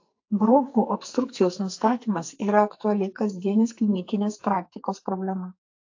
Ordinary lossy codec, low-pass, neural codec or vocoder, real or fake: AAC, 48 kbps; 7.2 kHz; codec, 44.1 kHz, 2.6 kbps, SNAC; fake